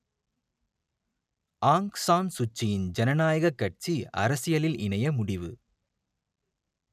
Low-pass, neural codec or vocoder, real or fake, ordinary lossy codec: 14.4 kHz; none; real; none